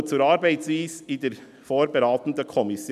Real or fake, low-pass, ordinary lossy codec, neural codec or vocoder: real; 14.4 kHz; none; none